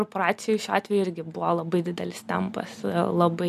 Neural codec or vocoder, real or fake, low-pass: none; real; 14.4 kHz